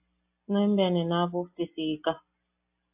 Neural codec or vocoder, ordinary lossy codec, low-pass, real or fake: none; AAC, 32 kbps; 3.6 kHz; real